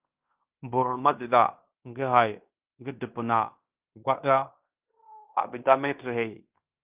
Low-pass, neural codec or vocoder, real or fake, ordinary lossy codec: 3.6 kHz; codec, 16 kHz in and 24 kHz out, 0.9 kbps, LongCat-Audio-Codec, fine tuned four codebook decoder; fake; Opus, 24 kbps